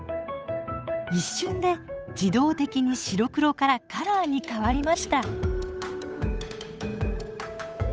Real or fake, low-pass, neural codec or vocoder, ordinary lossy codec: fake; none; codec, 16 kHz, 8 kbps, FunCodec, trained on Chinese and English, 25 frames a second; none